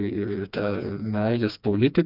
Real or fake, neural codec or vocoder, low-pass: fake; codec, 16 kHz, 2 kbps, FreqCodec, smaller model; 5.4 kHz